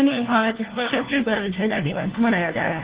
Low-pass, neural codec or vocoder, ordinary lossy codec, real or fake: 3.6 kHz; codec, 16 kHz, 1 kbps, FunCodec, trained on LibriTTS, 50 frames a second; Opus, 16 kbps; fake